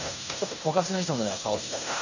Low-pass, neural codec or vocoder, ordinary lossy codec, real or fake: 7.2 kHz; codec, 16 kHz in and 24 kHz out, 0.9 kbps, LongCat-Audio-Codec, fine tuned four codebook decoder; none; fake